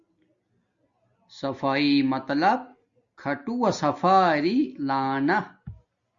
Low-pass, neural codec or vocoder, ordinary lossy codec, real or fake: 7.2 kHz; none; Opus, 64 kbps; real